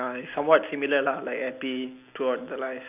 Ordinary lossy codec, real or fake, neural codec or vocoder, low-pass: none; real; none; 3.6 kHz